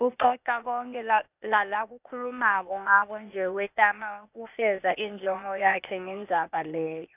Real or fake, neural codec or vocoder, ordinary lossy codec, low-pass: fake; codec, 16 kHz, 0.8 kbps, ZipCodec; none; 3.6 kHz